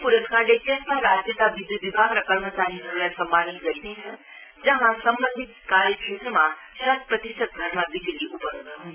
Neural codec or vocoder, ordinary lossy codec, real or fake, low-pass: none; Opus, 64 kbps; real; 3.6 kHz